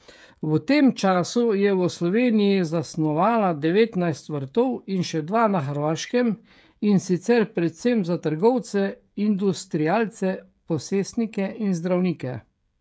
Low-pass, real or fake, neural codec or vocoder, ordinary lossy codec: none; fake; codec, 16 kHz, 16 kbps, FreqCodec, smaller model; none